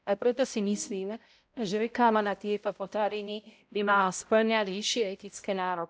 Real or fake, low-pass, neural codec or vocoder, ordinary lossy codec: fake; none; codec, 16 kHz, 0.5 kbps, X-Codec, HuBERT features, trained on balanced general audio; none